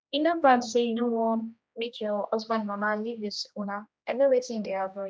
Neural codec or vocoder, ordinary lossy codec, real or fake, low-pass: codec, 16 kHz, 1 kbps, X-Codec, HuBERT features, trained on general audio; none; fake; none